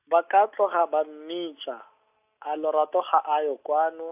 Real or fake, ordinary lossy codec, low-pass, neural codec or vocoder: real; AAC, 32 kbps; 3.6 kHz; none